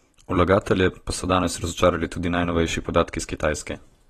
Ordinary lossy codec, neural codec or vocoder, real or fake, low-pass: AAC, 32 kbps; vocoder, 44.1 kHz, 128 mel bands every 256 samples, BigVGAN v2; fake; 19.8 kHz